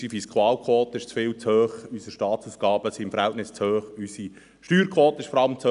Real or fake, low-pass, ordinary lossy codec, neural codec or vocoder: real; 10.8 kHz; none; none